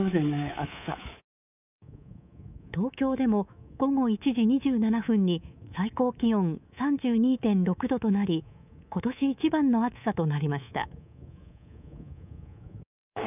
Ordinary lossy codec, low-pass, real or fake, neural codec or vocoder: none; 3.6 kHz; fake; codec, 24 kHz, 3.1 kbps, DualCodec